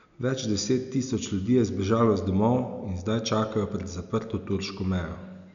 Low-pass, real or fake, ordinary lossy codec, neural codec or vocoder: 7.2 kHz; real; none; none